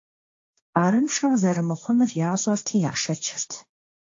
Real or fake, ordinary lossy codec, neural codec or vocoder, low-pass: fake; AAC, 48 kbps; codec, 16 kHz, 1.1 kbps, Voila-Tokenizer; 7.2 kHz